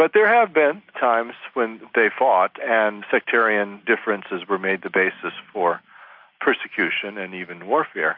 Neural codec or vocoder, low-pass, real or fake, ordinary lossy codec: none; 5.4 kHz; real; AAC, 48 kbps